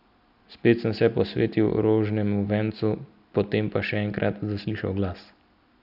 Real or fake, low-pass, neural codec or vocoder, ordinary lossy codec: real; 5.4 kHz; none; none